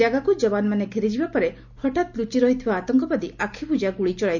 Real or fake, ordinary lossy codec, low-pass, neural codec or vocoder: real; none; 7.2 kHz; none